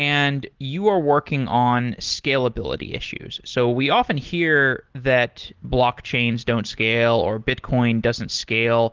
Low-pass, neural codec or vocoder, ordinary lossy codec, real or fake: 7.2 kHz; none; Opus, 16 kbps; real